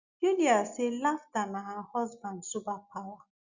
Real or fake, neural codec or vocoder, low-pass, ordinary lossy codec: real; none; 7.2 kHz; Opus, 64 kbps